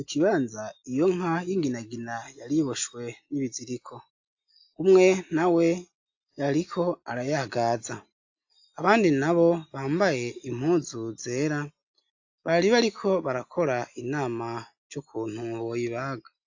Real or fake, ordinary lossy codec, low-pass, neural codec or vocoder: real; AAC, 48 kbps; 7.2 kHz; none